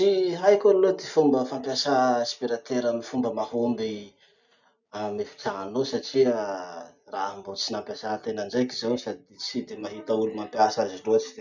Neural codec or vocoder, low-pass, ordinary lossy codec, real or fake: none; 7.2 kHz; none; real